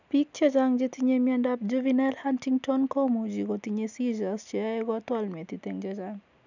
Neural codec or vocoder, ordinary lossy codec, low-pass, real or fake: none; none; 7.2 kHz; real